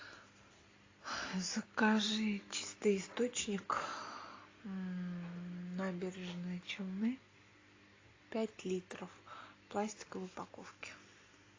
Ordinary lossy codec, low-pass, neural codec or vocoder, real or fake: AAC, 32 kbps; 7.2 kHz; none; real